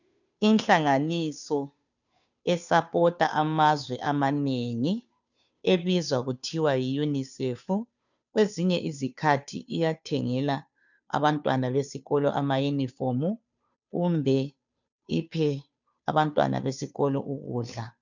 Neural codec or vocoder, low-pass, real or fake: codec, 16 kHz, 2 kbps, FunCodec, trained on Chinese and English, 25 frames a second; 7.2 kHz; fake